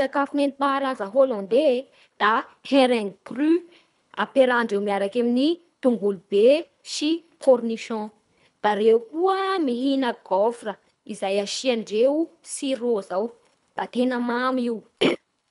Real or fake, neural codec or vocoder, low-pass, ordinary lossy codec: fake; codec, 24 kHz, 3 kbps, HILCodec; 10.8 kHz; none